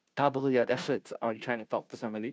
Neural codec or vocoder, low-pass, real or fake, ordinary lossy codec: codec, 16 kHz, 0.5 kbps, FunCodec, trained on Chinese and English, 25 frames a second; none; fake; none